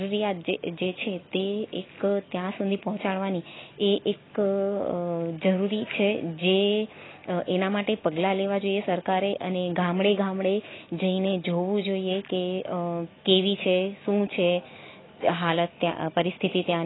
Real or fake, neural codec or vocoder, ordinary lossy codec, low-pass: real; none; AAC, 16 kbps; 7.2 kHz